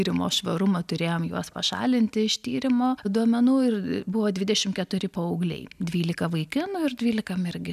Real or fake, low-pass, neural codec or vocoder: real; 14.4 kHz; none